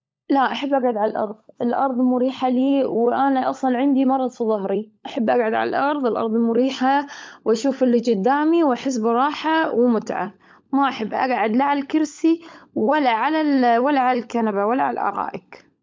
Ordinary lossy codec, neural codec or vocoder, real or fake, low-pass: none; codec, 16 kHz, 16 kbps, FunCodec, trained on LibriTTS, 50 frames a second; fake; none